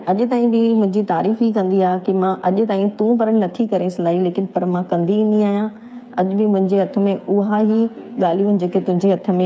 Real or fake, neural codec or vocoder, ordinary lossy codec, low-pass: fake; codec, 16 kHz, 8 kbps, FreqCodec, smaller model; none; none